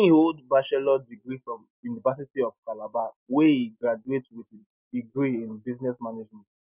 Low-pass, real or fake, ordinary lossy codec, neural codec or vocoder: 3.6 kHz; real; none; none